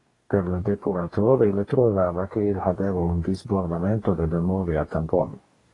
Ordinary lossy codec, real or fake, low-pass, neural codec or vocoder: AAC, 32 kbps; fake; 10.8 kHz; codec, 44.1 kHz, 2.6 kbps, DAC